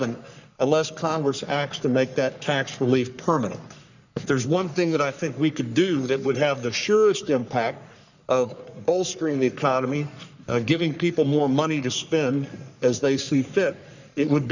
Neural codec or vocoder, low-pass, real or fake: codec, 44.1 kHz, 3.4 kbps, Pupu-Codec; 7.2 kHz; fake